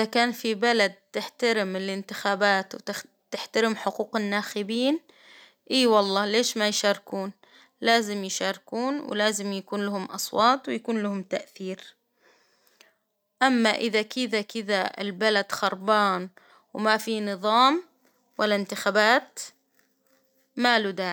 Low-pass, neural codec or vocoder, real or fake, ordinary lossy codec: none; none; real; none